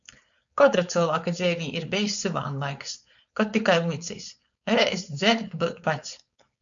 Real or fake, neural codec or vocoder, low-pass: fake; codec, 16 kHz, 4.8 kbps, FACodec; 7.2 kHz